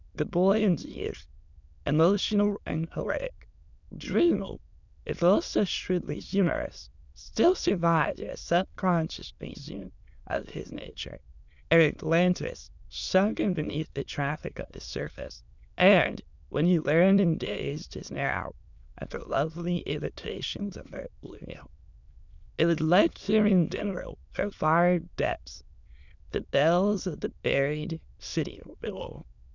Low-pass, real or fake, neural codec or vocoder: 7.2 kHz; fake; autoencoder, 22.05 kHz, a latent of 192 numbers a frame, VITS, trained on many speakers